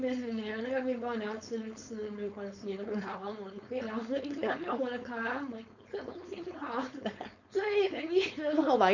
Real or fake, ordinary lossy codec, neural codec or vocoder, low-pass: fake; none; codec, 16 kHz, 4.8 kbps, FACodec; 7.2 kHz